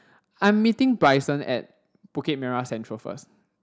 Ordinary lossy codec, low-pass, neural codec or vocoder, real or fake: none; none; none; real